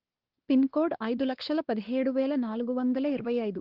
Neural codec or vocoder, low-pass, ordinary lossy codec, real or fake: codec, 16 kHz, 2 kbps, X-Codec, WavLM features, trained on Multilingual LibriSpeech; 5.4 kHz; Opus, 16 kbps; fake